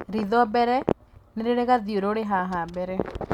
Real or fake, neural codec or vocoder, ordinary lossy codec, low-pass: real; none; none; 19.8 kHz